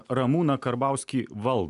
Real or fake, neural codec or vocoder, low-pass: real; none; 10.8 kHz